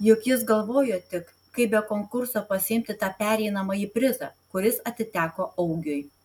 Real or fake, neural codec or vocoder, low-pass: real; none; 19.8 kHz